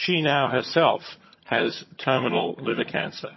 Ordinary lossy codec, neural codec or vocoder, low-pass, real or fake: MP3, 24 kbps; vocoder, 22.05 kHz, 80 mel bands, HiFi-GAN; 7.2 kHz; fake